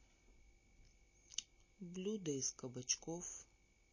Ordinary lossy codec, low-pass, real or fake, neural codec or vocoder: MP3, 32 kbps; 7.2 kHz; real; none